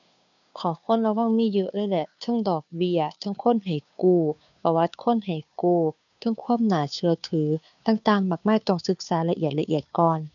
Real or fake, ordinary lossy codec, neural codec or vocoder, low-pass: fake; none; codec, 16 kHz, 2 kbps, FunCodec, trained on Chinese and English, 25 frames a second; 7.2 kHz